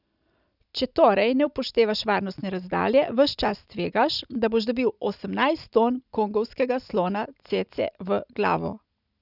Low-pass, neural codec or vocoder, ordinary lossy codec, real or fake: 5.4 kHz; none; none; real